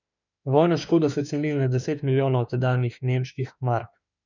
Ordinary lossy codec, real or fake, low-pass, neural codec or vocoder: none; fake; 7.2 kHz; autoencoder, 48 kHz, 32 numbers a frame, DAC-VAE, trained on Japanese speech